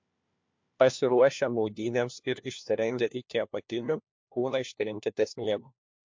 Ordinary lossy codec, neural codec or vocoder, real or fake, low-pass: MP3, 48 kbps; codec, 16 kHz, 1 kbps, FunCodec, trained on LibriTTS, 50 frames a second; fake; 7.2 kHz